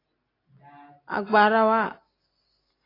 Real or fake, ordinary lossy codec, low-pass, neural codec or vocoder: real; AAC, 24 kbps; 5.4 kHz; none